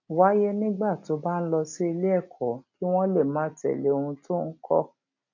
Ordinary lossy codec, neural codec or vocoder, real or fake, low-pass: none; none; real; 7.2 kHz